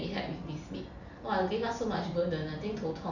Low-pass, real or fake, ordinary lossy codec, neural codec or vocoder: 7.2 kHz; real; none; none